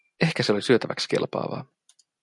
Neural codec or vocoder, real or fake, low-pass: none; real; 10.8 kHz